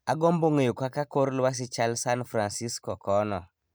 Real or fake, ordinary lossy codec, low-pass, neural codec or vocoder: real; none; none; none